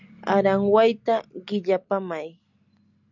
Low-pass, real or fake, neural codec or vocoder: 7.2 kHz; real; none